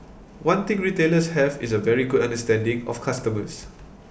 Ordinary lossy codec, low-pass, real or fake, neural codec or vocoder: none; none; real; none